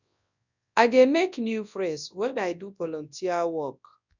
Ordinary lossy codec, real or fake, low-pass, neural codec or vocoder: none; fake; 7.2 kHz; codec, 24 kHz, 0.9 kbps, WavTokenizer, large speech release